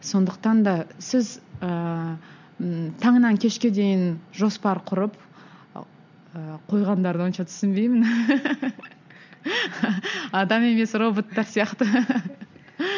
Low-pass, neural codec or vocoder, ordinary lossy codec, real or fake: 7.2 kHz; none; none; real